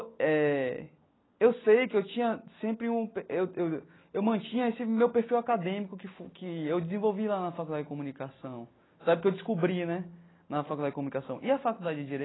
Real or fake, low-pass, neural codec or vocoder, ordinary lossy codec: real; 7.2 kHz; none; AAC, 16 kbps